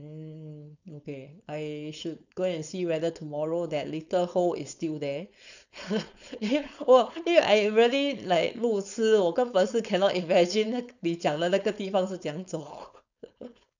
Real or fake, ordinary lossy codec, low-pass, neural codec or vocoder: fake; none; 7.2 kHz; codec, 16 kHz, 4.8 kbps, FACodec